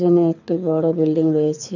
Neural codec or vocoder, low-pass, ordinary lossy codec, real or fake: codec, 24 kHz, 6 kbps, HILCodec; 7.2 kHz; none; fake